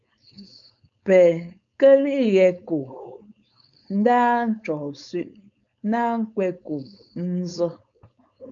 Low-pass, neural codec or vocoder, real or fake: 7.2 kHz; codec, 16 kHz, 4.8 kbps, FACodec; fake